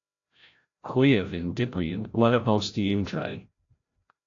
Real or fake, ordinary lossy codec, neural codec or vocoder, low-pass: fake; AAC, 48 kbps; codec, 16 kHz, 0.5 kbps, FreqCodec, larger model; 7.2 kHz